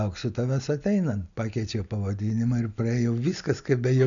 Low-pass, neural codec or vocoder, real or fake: 7.2 kHz; none; real